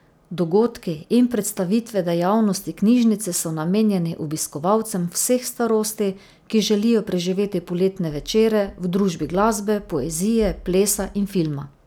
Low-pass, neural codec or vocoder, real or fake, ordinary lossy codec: none; none; real; none